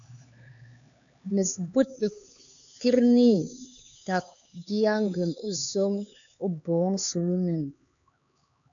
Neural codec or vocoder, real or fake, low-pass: codec, 16 kHz, 4 kbps, X-Codec, HuBERT features, trained on LibriSpeech; fake; 7.2 kHz